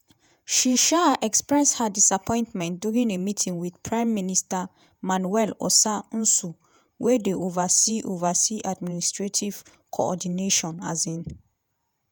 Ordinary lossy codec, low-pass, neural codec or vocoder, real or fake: none; none; vocoder, 48 kHz, 128 mel bands, Vocos; fake